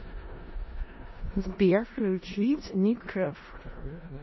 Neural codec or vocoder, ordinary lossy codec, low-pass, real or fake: codec, 16 kHz in and 24 kHz out, 0.4 kbps, LongCat-Audio-Codec, four codebook decoder; MP3, 24 kbps; 7.2 kHz; fake